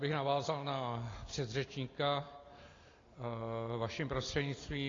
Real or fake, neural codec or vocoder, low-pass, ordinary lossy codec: real; none; 7.2 kHz; AAC, 32 kbps